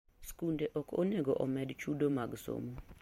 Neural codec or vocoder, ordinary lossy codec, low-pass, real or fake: vocoder, 44.1 kHz, 128 mel bands every 512 samples, BigVGAN v2; MP3, 64 kbps; 19.8 kHz; fake